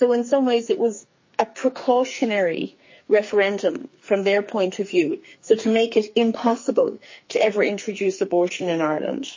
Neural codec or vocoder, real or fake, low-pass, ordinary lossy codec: codec, 44.1 kHz, 2.6 kbps, SNAC; fake; 7.2 kHz; MP3, 32 kbps